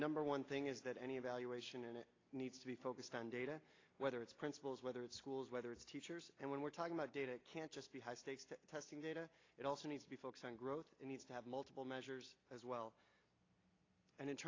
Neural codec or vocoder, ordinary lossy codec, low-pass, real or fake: none; AAC, 32 kbps; 7.2 kHz; real